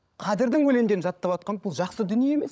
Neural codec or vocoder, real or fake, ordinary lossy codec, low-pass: codec, 16 kHz, 16 kbps, FunCodec, trained on LibriTTS, 50 frames a second; fake; none; none